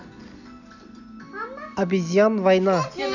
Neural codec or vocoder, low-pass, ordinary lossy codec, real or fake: none; 7.2 kHz; none; real